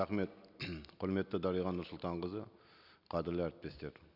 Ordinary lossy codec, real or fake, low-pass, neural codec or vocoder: none; real; 5.4 kHz; none